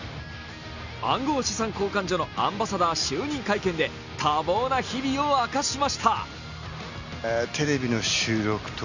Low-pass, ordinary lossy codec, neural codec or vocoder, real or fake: 7.2 kHz; Opus, 64 kbps; none; real